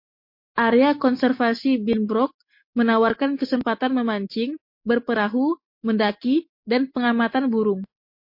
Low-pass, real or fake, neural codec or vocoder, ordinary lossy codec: 5.4 kHz; real; none; MP3, 32 kbps